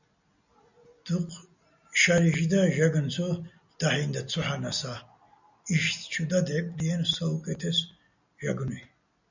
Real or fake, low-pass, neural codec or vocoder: real; 7.2 kHz; none